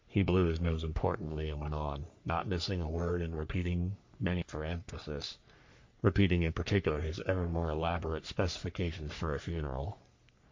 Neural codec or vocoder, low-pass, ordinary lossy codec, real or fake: codec, 44.1 kHz, 3.4 kbps, Pupu-Codec; 7.2 kHz; MP3, 48 kbps; fake